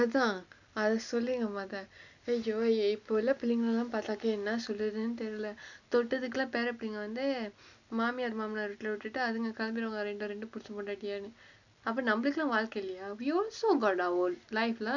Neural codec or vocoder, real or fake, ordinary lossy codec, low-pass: none; real; none; 7.2 kHz